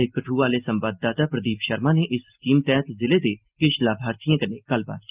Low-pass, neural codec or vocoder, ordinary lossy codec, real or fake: 3.6 kHz; none; Opus, 32 kbps; real